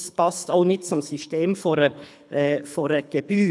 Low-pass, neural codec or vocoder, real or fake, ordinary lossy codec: none; codec, 24 kHz, 3 kbps, HILCodec; fake; none